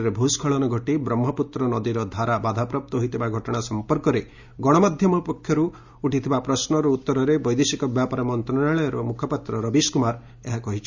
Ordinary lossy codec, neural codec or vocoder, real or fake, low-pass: Opus, 64 kbps; none; real; 7.2 kHz